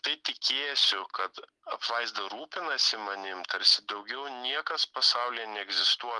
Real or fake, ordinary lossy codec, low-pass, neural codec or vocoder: real; Opus, 32 kbps; 10.8 kHz; none